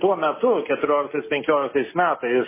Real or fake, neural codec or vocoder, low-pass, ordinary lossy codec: real; none; 3.6 kHz; MP3, 16 kbps